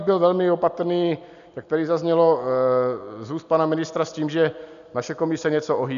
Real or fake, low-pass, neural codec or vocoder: real; 7.2 kHz; none